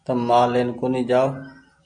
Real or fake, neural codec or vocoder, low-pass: real; none; 9.9 kHz